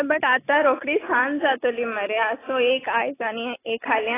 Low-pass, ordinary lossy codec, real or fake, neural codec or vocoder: 3.6 kHz; AAC, 16 kbps; real; none